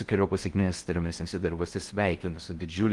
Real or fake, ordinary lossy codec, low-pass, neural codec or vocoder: fake; Opus, 24 kbps; 10.8 kHz; codec, 16 kHz in and 24 kHz out, 0.6 kbps, FocalCodec, streaming, 4096 codes